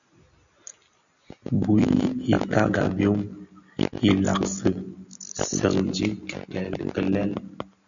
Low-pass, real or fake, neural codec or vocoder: 7.2 kHz; real; none